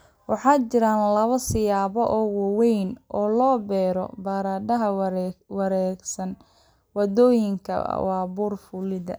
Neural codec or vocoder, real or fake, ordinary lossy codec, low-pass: none; real; none; none